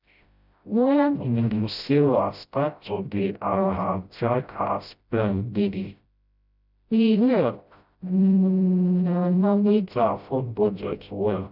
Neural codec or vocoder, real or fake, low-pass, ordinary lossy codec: codec, 16 kHz, 0.5 kbps, FreqCodec, smaller model; fake; 5.4 kHz; none